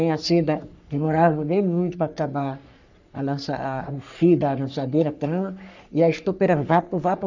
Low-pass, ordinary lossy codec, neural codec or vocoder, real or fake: 7.2 kHz; none; codec, 44.1 kHz, 3.4 kbps, Pupu-Codec; fake